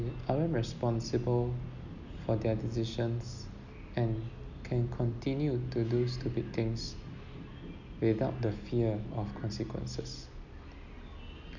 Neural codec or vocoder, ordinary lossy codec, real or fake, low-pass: none; none; real; 7.2 kHz